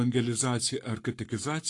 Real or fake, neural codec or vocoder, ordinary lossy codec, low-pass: fake; codec, 44.1 kHz, 7.8 kbps, Pupu-Codec; AAC, 48 kbps; 10.8 kHz